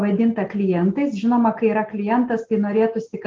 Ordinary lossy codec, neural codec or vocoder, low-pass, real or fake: Opus, 24 kbps; none; 7.2 kHz; real